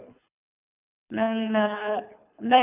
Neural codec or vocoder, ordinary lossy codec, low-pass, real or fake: codec, 24 kHz, 3 kbps, HILCodec; none; 3.6 kHz; fake